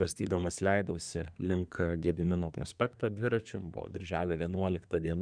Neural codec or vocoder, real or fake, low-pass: codec, 24 kHz, 1 kbps, SNAC; fake; 9.9 kHz